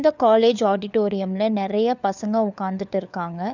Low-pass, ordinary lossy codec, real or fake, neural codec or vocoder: 7.2 kHz; none; fake; codec, 16 kHz, 4 kbps, FunCodec, trained on LibriTTS, 50 frames a second